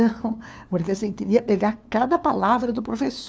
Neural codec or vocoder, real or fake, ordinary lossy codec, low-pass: codec, 16 kHz, 2 kbps, FunCodec, trained on LibriTTS, 25 frames a second; fake; none; none